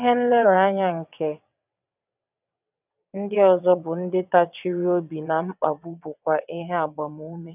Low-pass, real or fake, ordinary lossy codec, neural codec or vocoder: 3.6 kHz; fake; none; vocoder, 22.05 kHz, 80 mel bands, WaveNeXt